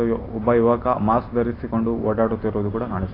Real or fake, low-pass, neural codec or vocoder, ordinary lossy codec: fake; 5.4 kHz; vocoder, 44.1 kHz, 128 mel bands every 512 samples, BigVGAN v2; AAC, 24 kbps